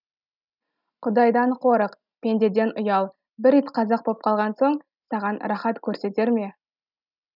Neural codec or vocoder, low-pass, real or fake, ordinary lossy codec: none; 5.4 kHz; real; none